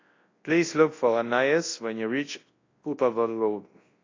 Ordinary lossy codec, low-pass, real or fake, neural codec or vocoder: AAC, 32 kbps; 7.2 kHz; fake; codec, 24 kHz, 0.9 kbps, WavTokenizer, large speech release